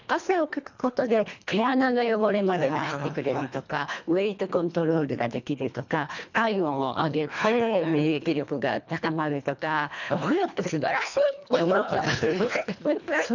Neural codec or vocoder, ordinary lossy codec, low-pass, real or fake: codec, 24 kHz, 1.5 kbps, HILCodec; none; 7.2 kHz; fake